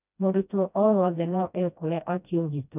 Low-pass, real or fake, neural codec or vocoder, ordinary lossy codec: 3.6 kHz; fake; codec, 16 kHz, 1 kbps, FreqCodec, smaller model; none